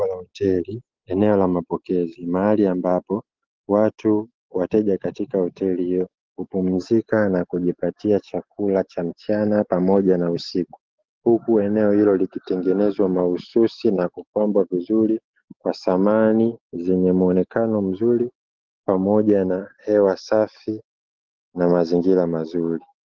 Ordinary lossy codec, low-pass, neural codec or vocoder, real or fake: Opus, 16 kbps; 7.2 kHz; none; real